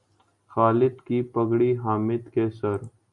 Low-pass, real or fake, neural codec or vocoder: 10.8 kHz; real; none